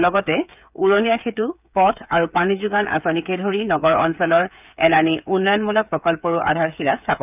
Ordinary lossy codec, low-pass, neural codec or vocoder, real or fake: none; 3.6 kHz; codec, 16 kHz, 8 kbps, FreqCodec, smaller model; fake